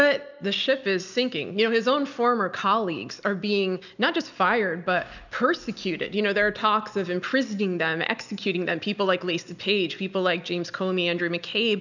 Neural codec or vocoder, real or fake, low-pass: none; real; 7.2 kHz